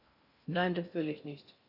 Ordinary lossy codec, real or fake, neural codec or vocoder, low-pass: none; fake; codec, 16 kHz in and 24 kHz out, 0.6 kbps, FocalCodec, streaming, 2048 codes; 5.4 kHz